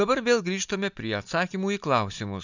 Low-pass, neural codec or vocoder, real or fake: 7.2 kHz; none; real